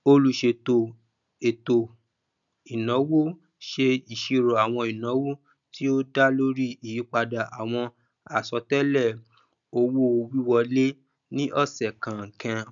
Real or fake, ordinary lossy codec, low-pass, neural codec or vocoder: real; none; 7.2 kHz; none